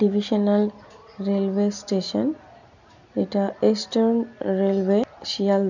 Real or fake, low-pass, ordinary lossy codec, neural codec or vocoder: real; 7.2 kHz; none; none